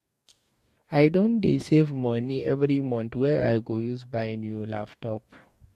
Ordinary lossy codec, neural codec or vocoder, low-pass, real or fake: MP3, 64 kbps; codec, 44.1 kHz, 2.6 kbps, DAC; 14.4 kHz; fake